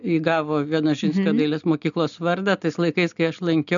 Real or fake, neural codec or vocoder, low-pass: real; none; 7.2 kHz